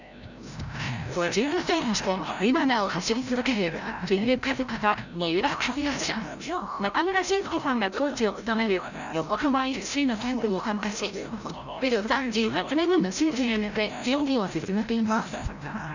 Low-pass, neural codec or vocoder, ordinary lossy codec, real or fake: 7.2 kHz; codec, 16 kHz, 0.5 kbps, FreqCodec, larger model; none; fake